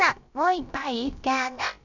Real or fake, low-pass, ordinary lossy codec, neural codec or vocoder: fake; 7.2 kHz; none; codec, 16 kHz, about 1 kbps, DyCAST, with the encoder's durations